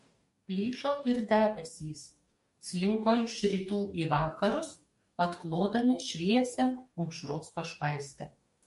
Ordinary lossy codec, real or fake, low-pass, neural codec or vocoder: MP3, 48 kbps; fake; 14.4 kHz; codec, 44.1 kHz, 2.6 kbps, DAC